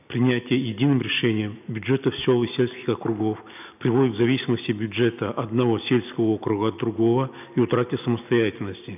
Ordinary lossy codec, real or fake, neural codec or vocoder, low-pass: none; real; none; 3.6 kHz